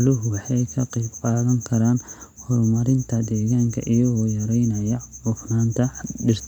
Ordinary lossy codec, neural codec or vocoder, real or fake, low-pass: none; none; real; 19.8 kHz